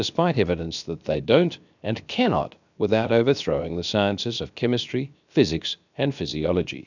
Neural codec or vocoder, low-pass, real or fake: codec, 16 kHz, 0.7 kbps, FocalCodec; 7.2 kHz; fake